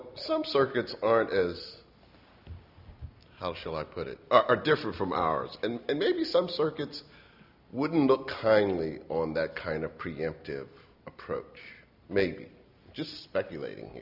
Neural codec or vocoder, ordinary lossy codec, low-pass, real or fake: none; Opus, 64 kbps; 5.4 kHz; real